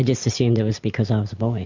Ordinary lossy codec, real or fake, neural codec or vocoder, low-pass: MP3, 64 kbps; real; none; 7.2 kHz